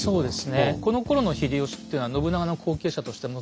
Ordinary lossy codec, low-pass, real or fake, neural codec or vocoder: none; none; real; none